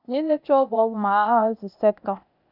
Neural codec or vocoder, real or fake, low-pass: codec, 16 kHz, 0.8 kbps, ZipCodec; fake; 5.4 kHz